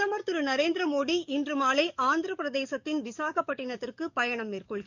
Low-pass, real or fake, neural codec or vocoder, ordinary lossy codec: 7.2 kHz; fake; codec, 44.1 kHz, 7.8 kbps, DAC; none